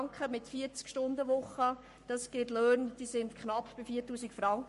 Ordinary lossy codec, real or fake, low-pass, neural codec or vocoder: MP3, 48 kbps; fake; 14.4 kHz; codec, 44.1 kHz, 7.8 kbps, Pupu-Codec